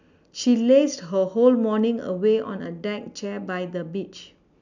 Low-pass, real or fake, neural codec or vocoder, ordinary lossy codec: 7.2 kHz; real; none; none